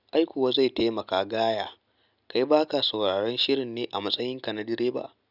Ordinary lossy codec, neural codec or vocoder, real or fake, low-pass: none; none; real; 5.4 kHz